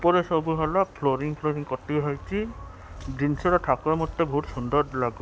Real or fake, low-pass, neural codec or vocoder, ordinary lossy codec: real; none; none; none